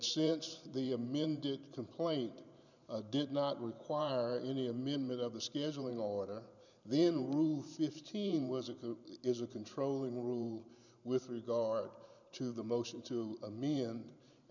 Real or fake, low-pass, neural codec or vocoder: fake; 7.2 kHz; vocoder, 44.1 kHz, 128 mel bands every 512 samples, BigVGAN v2